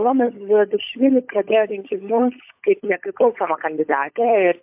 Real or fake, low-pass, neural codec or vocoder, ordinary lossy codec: fake; 3.6 kHz; codec, 16 kHz, 16 kbps, FunCodec, trained on LibriTTS, 50 frames a second; MP3, 32 kbps